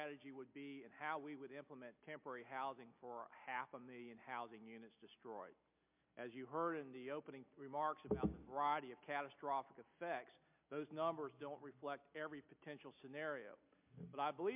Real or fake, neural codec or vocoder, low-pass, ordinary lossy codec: real; none; 3.6 kHz; MP3, 32 kbps